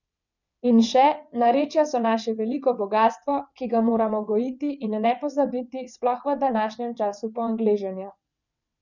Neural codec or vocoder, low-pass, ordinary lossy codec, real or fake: vocoder, 22.05 kHz, 80 mel bands, WaveNeXt; 7.2 kHz; none; fake